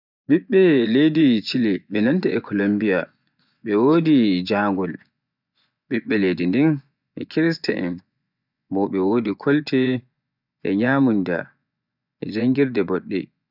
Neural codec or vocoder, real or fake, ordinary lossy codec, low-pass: vocoder, 24 kHz, 100 mel bands, Vocos; fake; none; 5.4 kHz